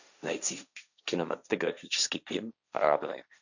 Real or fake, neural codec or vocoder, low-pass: fake; codec, 16 kHz, 1.1 kbps, Voila-Tokenizer; 7.2 kHz